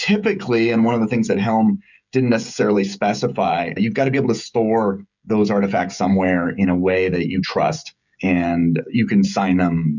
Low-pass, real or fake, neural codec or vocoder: 7.2 kHz; fake; codec, 16 kHz, 16 kbps, FreqCodec, smaller model